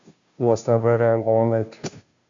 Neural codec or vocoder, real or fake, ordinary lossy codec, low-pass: codec, 16 kHz, 0.5 kbps, FunCodec, trained on Chinese and English, 25 frames a second; fake; Opus, 64 kbps; 7.2 kHz